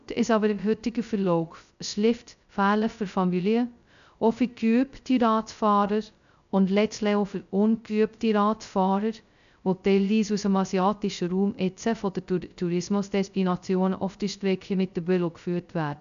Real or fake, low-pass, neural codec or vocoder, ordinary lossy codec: fake; 7.2 kHz; codec, 16 kHz, 0.2 kbps, FocalCodec; none